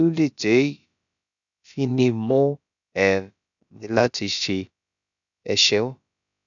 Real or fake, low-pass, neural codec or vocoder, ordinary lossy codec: fake; 7.2 kHz; codec, 16 kHz, about 1 kbps, DyCAST, with the encoder's durations; none